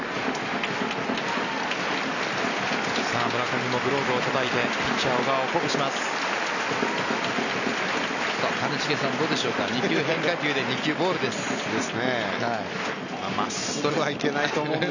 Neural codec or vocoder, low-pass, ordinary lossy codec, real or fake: none; 7.2 kHz; none; real